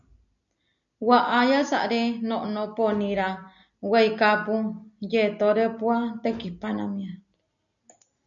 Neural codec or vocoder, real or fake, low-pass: none; real; 7.2 kHz